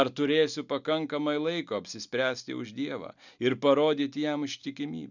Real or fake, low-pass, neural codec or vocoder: real; 7.2 kHz; none